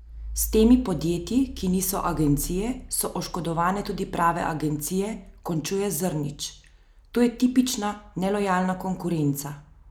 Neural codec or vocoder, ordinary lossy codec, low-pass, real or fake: none; none; none; real